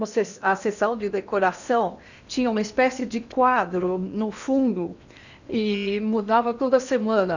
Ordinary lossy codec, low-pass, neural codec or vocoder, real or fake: none; 7.2 kHz; codec, 16 kHz in and 24 kHz out, 0.8 kbps, FocalCodec, streaming, 65536 codes; fake